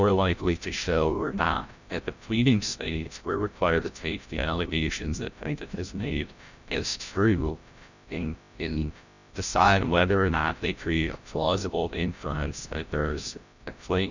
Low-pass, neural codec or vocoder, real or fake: 7.2 kHz; codec, 16 kHz, 0.5 kbps, FreqCodec, larger model; fake